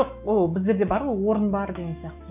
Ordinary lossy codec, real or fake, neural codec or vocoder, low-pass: none; fake; autoencoder, 48 kHz, 128 numbers a frame, DAC-VAE, trained on Japanese speech; 3.6 kHz